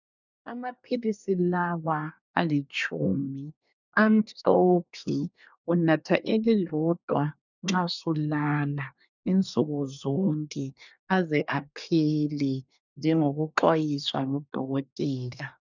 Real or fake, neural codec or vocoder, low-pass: fake; codec, 24 kHz, 1 kbps, SNAC; 7.2 kHz